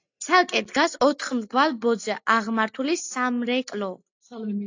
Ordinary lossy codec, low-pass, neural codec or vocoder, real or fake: AAC, 48 kbps; 7.2 kHz; none; real